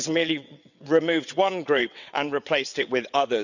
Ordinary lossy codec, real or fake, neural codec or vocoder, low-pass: none; fake; vocoder, 22.05 kHz, 80 mel bands, WaveNeXt; 7.2 kHz